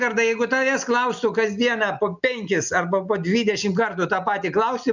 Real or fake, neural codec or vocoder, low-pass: real; none; 7.2 kHz